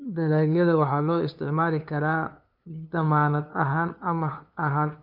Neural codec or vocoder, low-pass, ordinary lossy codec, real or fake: codec, 16 kHz, 2 kbps, FunCodec, trained on LibriTTS, 25 frames a second; 5.4 kHz; none; fake